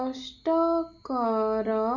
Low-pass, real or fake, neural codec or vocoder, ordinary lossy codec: 7.2 kHz; real; none; none